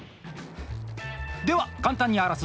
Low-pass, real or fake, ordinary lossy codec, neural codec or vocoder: none; real; none; none